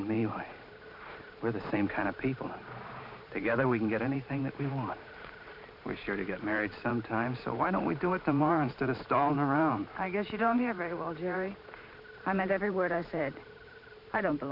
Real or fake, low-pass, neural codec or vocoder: fake; 5.4 kHz; vocoder, 44.1 kHz, 128 mel bands every 512 samples, BigVGAN v2